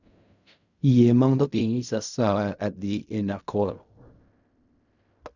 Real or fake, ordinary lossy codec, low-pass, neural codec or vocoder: fake; none; 7.2 kHz; codec, 16 kHz in and 24 kHz out, 0.4 kbps, LongCat-Audio-Codec, fine tuned four codebook decoder